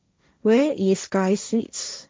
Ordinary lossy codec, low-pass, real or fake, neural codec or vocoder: none; none; fake; codec, 16 kHz, 1.1 kbps, Voila-Tokenizer